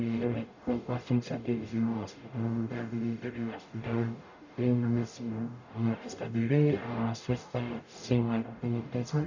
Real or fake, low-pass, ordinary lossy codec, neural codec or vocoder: fake; 7.2 kHz; none; codec, 44.1 kHz, 0.9 kbps, DAC